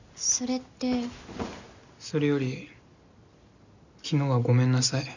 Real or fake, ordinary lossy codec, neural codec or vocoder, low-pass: real; none; none; 7.2 kHz